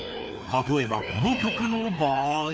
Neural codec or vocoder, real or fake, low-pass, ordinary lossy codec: codec, 16 kHz, 2 kbps, FreqCodec, larger model; fake; none; none